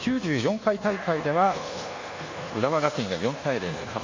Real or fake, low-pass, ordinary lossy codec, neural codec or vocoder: fake; 7.2 kHz; MP3, 64 kbps; codec, 24 kHz, 1.2 kbps, DualCodec